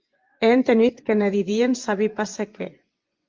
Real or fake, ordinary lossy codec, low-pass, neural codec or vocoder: fake; Opus, 24 kbps; 7.2 kHz; vocoder, 24 kHz, 100 mel bands, Vocos